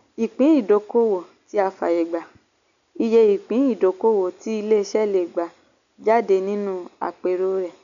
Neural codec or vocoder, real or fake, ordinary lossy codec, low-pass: none; real; none; 7.2 kHz